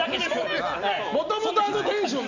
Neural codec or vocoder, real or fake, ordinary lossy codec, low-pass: none; real; none; 7.2 kHz